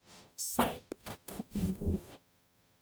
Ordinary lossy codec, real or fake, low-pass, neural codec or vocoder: none; fake; none; codec, 44.1 kHz, 0.9 kbps, DAC